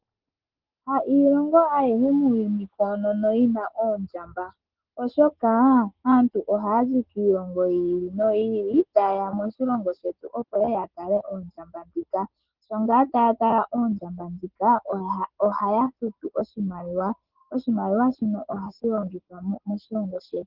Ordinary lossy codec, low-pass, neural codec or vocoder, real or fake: Opus, 16 kbps; 5.4 kHz; none; real